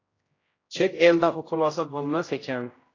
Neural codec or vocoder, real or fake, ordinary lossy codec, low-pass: codec, 16 kHz, 0.5 kbps, X-Codec, HuBERT features, trained on general audio; fake; AAC, 32 kbps; 7.2 kHz